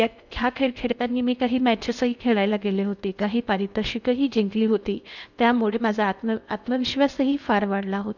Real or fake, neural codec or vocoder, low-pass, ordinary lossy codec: fake; codec, 16 kHz in and 24 kHz out, 0.8 kbps, FocalCodec, streaming, 65536 codes; 7.2 kHz; none